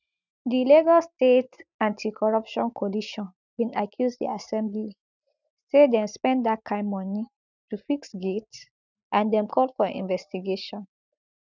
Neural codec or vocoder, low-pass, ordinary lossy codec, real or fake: none; 7.2 kHz; none; real